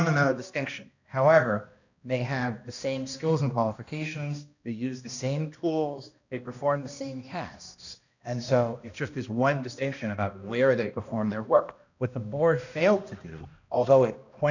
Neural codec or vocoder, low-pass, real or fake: codec, 16 kHz, 1 kbps, X-Codec, HuBERT features, trained on balanced general audio; 7.2 kHz; fake